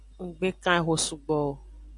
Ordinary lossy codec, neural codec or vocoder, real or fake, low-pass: MP3, 96 kbps; none; real; 10.8 kHz